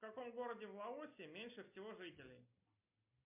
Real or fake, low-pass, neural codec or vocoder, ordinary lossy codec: real; 3.6 kHz; none; MP3, 32 kbps